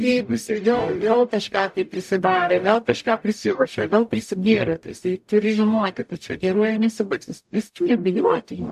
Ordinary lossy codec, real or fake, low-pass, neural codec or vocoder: AAC, 96 kbps; fake; 14.4 kHz; codec, 44.1 kHz, 0.9 kbps, DAC